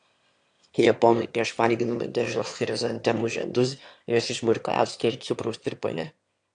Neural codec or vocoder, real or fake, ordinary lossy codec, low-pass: autoencoder, 22.05 kHz, a latent of 192 numbers a frame, VITS, trained on one speaker; fake; AAC, 64 kbps; 9.9 kHz